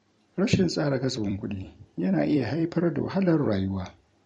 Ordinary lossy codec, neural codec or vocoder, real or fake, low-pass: MP3, 48 kbps; vocoder, 44.1 kHz, 128 mel bands every 256 samples, BigVGAN v2; fake; 19.8 kHz